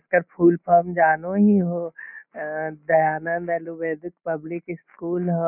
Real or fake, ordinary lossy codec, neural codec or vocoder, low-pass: real; none; none; 3.6 kHz